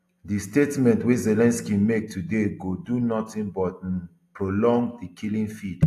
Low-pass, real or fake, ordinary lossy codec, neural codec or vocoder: 14.4 kHz; real; AAC, 64 kbps; none